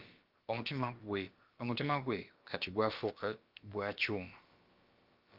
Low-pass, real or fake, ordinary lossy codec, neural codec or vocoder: 5.4 kHz; fake; Opus, 24 kbps; codec, 16 kHz, about 1 kbps, DyCAST, with the encoder's durations